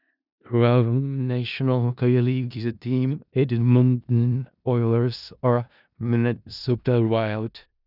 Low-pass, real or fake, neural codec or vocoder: 5.4 kHz; fake; codec, 16 kHz in and 24 kHz out, 0.4 kbps, LongCat-Audio-Codec, four codebook decoder